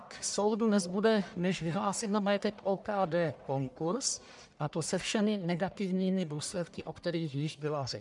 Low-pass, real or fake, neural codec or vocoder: 10.8 kHz; fake; codec, 44.1 kHz, 1.7 kbps, Pupu-Codec